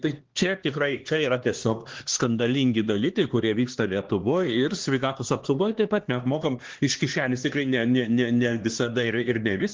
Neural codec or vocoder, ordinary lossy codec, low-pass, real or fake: codec, 16 kHz, 2 kbps, X-Codec, HuBERT features, trained on LibriSpeech; Opus, 16 kbps; 7.2 kHz; fake